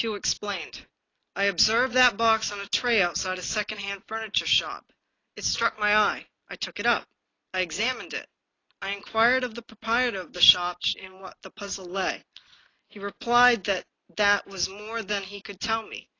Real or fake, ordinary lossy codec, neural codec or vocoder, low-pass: real; AAC, 32 kbps; none; 7.2 kHz